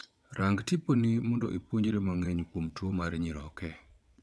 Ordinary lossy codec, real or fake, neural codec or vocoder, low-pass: none; fake; vocoder, 22.05 kHz, 80 mel bands, WaveNeXt; none